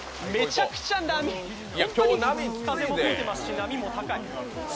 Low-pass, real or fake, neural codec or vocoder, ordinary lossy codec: none; real; none; none